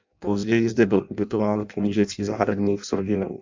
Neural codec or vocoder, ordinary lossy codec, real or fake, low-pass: codec, 16 kHz in and 24 kHz out, 0.6 kbps, FireRedTTS-2 codec; MP3, 64 kbps; fake; 7.2 kHz